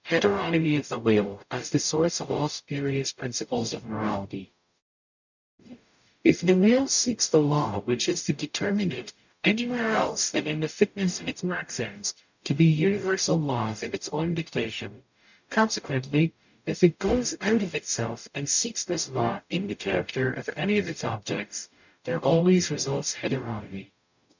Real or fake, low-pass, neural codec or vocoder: fake; 7.2 kHz; codec, 44.1 kHz, 0.9 kbps, DAC